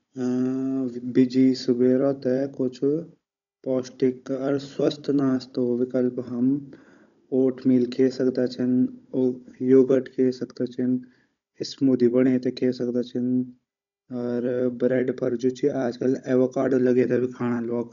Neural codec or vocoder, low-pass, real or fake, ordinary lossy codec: codec, 16 kHz, 16 kbps, FunCodec, trained on Chinese and English, 50 frames a second; 7.2 kHz; fake; none